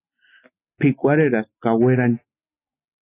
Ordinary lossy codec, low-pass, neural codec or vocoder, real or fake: AAC, 16 kbps; 3.6 kHz; none; real